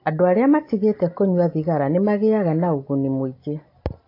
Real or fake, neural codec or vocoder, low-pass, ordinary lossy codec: real; none; 5.4 kHz; AAC, 32 kbps